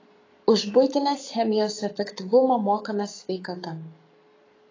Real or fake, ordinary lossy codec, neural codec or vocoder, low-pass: fake; AAC, 32 kbps; codec, 44.1 kHz, 7.8 kbps, Pupu-Codec; 7.2 kHz